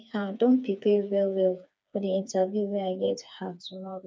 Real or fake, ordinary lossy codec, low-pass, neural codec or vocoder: fake; none; none; codec, 16 kHz, 4 kbps, FreqCodec, smaller model